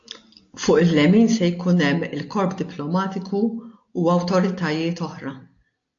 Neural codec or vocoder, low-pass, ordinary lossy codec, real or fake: none; 7.2 kHz; AAC, 48 kbps; real